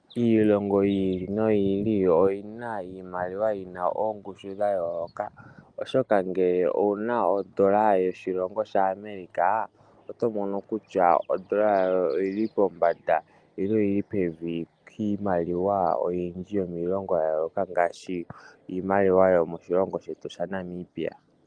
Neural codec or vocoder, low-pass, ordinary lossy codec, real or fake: none; 9.9 kHz; Opus, 32 kbps; real